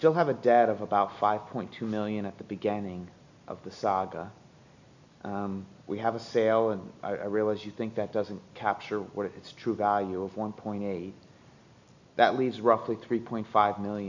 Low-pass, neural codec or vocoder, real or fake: 7.2 kHz; none; real